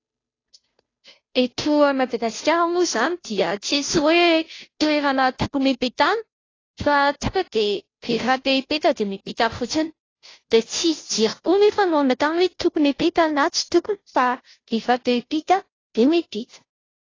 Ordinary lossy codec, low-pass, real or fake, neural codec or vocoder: AAC, 32 kbps; 7.2 kHz; fake; codec, 16 kHz, 0.5 kbps, FunCodec, trained on Chinese and English, 25 frames a second